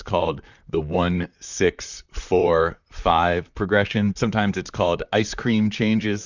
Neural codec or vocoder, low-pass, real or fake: vocoder, 44.1 kHz, 128 mel bands, Pupu-Vocoder; 7.2 kHz; fake